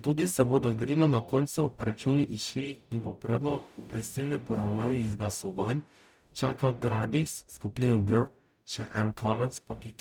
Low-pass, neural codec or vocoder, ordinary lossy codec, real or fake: none; codec, 44.1 kHz, 0.9 kbps, DAC; none; fake